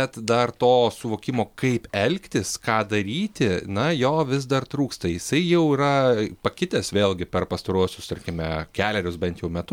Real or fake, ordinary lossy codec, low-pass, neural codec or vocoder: real; MP3, 96 kbps; 19.8 kHz; none